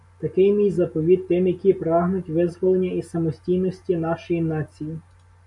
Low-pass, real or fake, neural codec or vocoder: 10.8 kHz; real; none